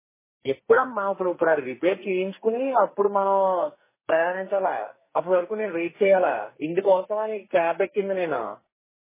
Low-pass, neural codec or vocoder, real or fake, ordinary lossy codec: 3.6 kHz; codec, 44.1 kHz, 2.6 kbps, SNAC; fake; MP3, 16 kbps